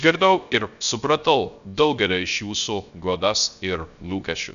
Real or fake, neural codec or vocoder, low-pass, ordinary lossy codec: fake; codec, 16 kHz, 0.3 kbps, FocalCodec; 7.2 kHz; MP3, 96 kbps